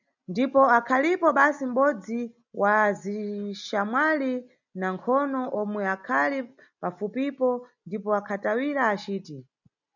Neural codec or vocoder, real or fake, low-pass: none; real; 7.2 kHz